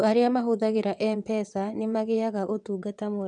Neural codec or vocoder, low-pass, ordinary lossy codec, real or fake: vocoder, 22.05 kHz, 80 mel bands, WaveNeXt; 9.9 kHz; none; fake